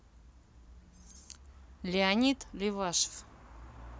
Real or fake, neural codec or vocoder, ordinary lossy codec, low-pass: real; none; none; none